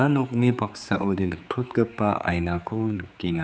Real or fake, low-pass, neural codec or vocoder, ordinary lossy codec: fake; none; codec, 16 kHz, 4 kbps, X-Codec, HuBERT features, trained on general audio; none